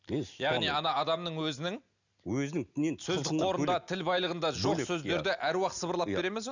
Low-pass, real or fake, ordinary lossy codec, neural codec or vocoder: 7.2 kHz; real; none; none